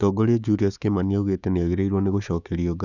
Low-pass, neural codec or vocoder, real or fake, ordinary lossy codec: 7.2 kHz; codec, 16 kHz, 6 kbps, DAC; fake; none